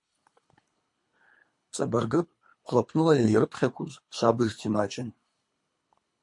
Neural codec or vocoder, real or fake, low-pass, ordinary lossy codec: codec, 24 kHz, 3 kbps, HILCodec; fake; 10.8 kHz; MP3, 48 kbps